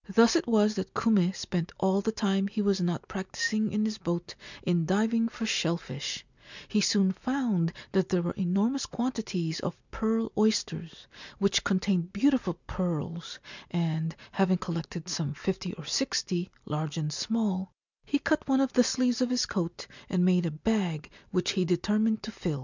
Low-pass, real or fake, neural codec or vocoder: 7.2 kHz; real; none